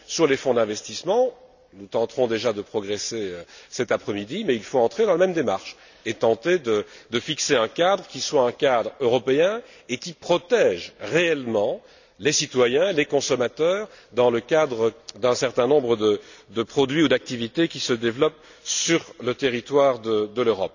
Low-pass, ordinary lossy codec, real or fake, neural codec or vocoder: 7.2 kHz; none; real; none